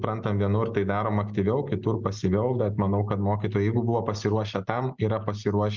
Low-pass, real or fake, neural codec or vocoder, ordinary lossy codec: 7.2 kHz; real; none; Opus, 24 kbps